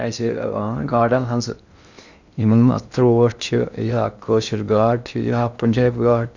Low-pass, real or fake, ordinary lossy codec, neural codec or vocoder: 7.2 kHz; fake; none; codec, 16 kHz in and 24 kHz out, 0.6 kbps, FocalCodec, streaming, 2048 codes